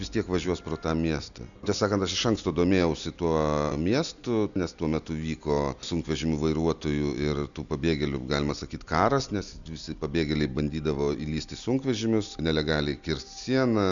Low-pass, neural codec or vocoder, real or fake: 7.2 kHz; none; real